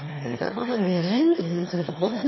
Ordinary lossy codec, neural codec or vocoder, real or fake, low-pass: MP3, 24 kbps; autoencoder, 22.05 kHz, a latent of 192 numbers a frame, VITS, trained on one speaker; fake; 7.2 kHz